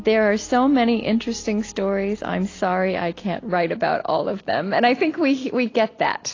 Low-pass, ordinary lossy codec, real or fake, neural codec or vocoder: 7.2 kHz; AAC, 32 kbps; real; none